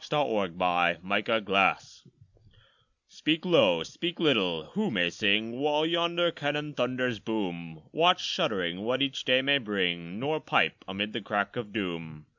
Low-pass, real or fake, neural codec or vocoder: 7.2 kHz; real; none